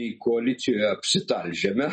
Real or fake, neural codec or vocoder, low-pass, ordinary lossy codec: real; none; 10.8 kHz; MP3, 32 kbps